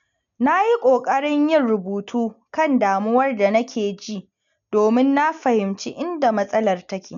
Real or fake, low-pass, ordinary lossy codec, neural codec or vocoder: real; 7.2 kHz; none; none